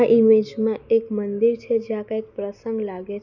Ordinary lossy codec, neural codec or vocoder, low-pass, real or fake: none; none; 7.2 kHz; real